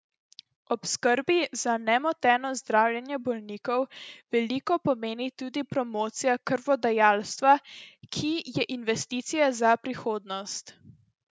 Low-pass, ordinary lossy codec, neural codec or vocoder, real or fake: none; none; none; real